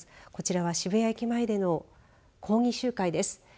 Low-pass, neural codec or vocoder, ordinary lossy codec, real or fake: none; none; none; real